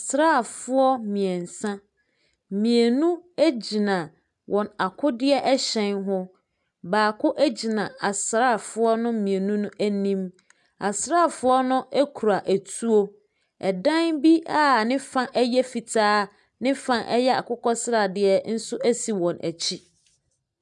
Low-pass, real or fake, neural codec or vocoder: 10.8 kHz; real; none